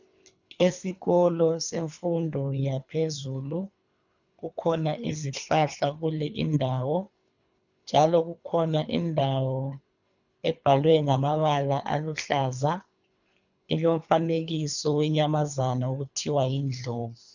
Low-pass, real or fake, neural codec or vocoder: 7.2 kHz; fake; codec, 24 kHz, 3 kbps, HILCodec